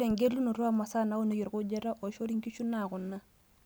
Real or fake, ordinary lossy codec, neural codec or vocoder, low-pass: real; none; none; none